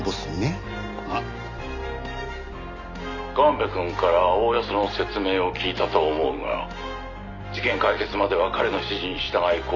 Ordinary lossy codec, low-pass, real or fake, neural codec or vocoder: none; 7.2 kHz; real; none